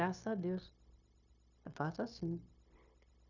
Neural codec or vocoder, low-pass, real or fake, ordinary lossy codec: codec, 16 kHz, 0.9 kbps, LongCat-Audio-Codec; 7.2 kHz; fake; none